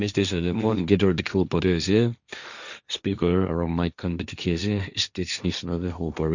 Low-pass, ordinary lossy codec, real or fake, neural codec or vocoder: 7.2 kHz; none; fake; codec, 16 kHz, 1.1 kbps, Voila-Tokenizer